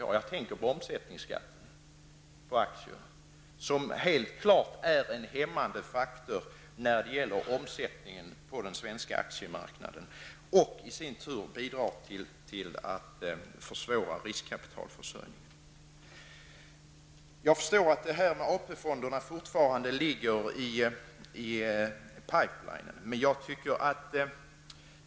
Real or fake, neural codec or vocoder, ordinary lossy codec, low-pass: real; none; none; none